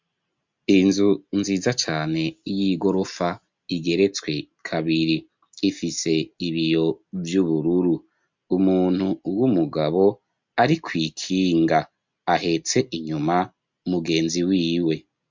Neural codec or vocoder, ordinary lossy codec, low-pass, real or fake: none; MP3, 64 kbps; 7.2 kHz; real